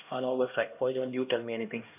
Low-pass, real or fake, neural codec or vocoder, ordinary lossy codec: 3.6 kHz; fake; codec, 16 kHz, 1 kbps, X-Codec, HuBERT features, trained on LibriSpeech; none